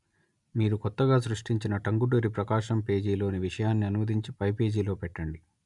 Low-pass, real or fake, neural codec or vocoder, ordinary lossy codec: 10.8 kHz; real; none; none